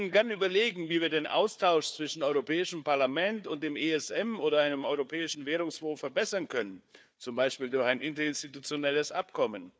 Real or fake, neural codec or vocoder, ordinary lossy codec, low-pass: fake; codec, 16 kHz, 4 kbps, FunCodec, trained on Chinese and English, 50 frames a second; none; none